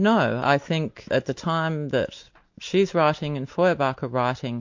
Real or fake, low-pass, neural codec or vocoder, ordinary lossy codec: real; 7.2 kHz; none; MP3, 48 kbps